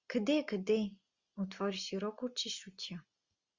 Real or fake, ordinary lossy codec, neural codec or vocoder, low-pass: real; Opus, 64 kbps; none; 7.2 kHz